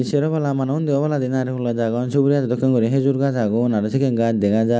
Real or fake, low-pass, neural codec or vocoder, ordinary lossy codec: real; none; none; none